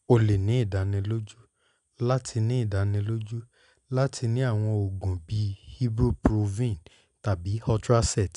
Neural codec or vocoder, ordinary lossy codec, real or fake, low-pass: none; none; real; 10.8 kHz